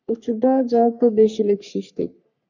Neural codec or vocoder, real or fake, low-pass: codec, 44.1 kHz, 3.4 kbps, Pupu-Codec; fake; 7.2 kHz